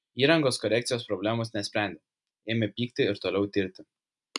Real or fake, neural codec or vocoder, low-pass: real; none; 10.8 kHz